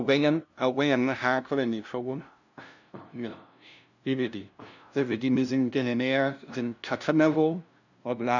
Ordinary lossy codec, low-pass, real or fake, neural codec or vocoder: none; 7.2 kHz; fake; codec, 16 kHz, 0.5 kbps, FunCodec, trained on LibriTTS, 25 frames a second